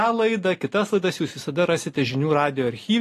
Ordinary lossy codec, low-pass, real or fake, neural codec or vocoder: AAC, 48 kbps; 14.4 kHz; real; none